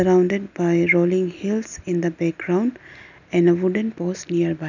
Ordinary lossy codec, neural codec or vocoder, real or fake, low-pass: none; none; real; 7.2 kHz